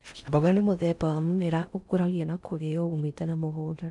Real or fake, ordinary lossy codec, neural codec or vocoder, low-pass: fake; AAC, 64 kbps; codec, 16 kHz in and 24 kHz out, 0.6 kbps, FocalCodec, streaming, 4096 codes; 10.8 kHz